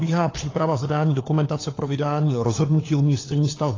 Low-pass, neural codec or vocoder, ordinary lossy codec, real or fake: 7.2 kHz; codec, 16 kHz, 4 kbps, FunCodec, trained on LibriTTS, 50 frames a second; AAC, 32 kbps; fake